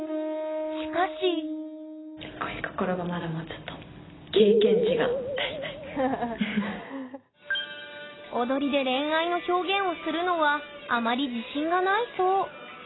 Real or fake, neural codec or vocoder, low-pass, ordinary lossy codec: real; none; 7.2 kHz; AAC, 16 kbps